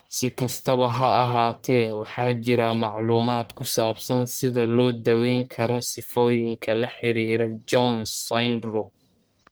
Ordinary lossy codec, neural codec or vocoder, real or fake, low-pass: none; codec, 44.1 kHz, 1.7 kbps, Pupu-Codec; fake; none